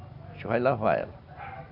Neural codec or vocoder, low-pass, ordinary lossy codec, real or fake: none; 5.4 kHz; none; real